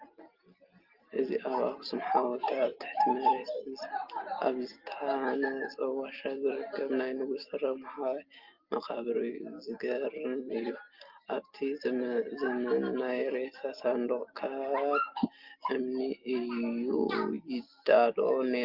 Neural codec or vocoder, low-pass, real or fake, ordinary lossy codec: none; 5.4 kHz; real; Opus, 32 kbps